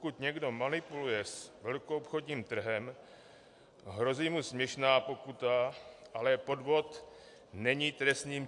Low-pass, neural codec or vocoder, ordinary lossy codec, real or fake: 10.8 kHz; none; AAC, 64 kbps; real